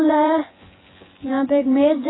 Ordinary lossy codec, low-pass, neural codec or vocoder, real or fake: AAC, 16 kbps; 7.2 kHz; vocoder, 24 kHz, 100 mel bands, Vocos; fake